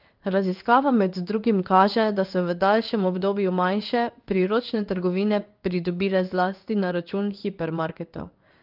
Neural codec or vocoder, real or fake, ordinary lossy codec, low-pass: codec, 16 kHz in and 24 kHz out, 1 kbps, XY-Tokenizer; fake; Opus, 24 kbps; 5.4 kHz